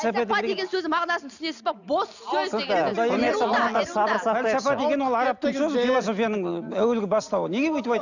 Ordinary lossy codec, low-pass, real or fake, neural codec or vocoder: none; 7.2 kHz; real; none